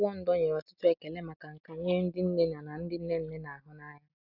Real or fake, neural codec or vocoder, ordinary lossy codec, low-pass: real; none; none; 5.4 kHz